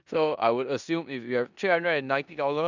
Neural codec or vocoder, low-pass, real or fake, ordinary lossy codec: codec, 16 kHz in and 24 kHz out, 0.9 kbps, LongCat-Audio-Codec, four codebook decoder; 7.2 kHz; fake; none